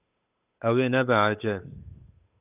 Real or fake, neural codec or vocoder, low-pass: fake; codec, 16 kHz, 8 kbps, FunCodec, trained on Chinese and English, 25 frames a second; 3.6 kHz